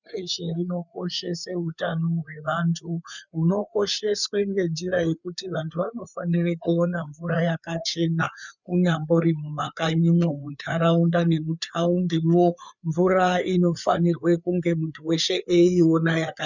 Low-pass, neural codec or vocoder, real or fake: 7.2 kHz; codec, 16 kHz, 4 kbps, FreqCodec, larger model; fake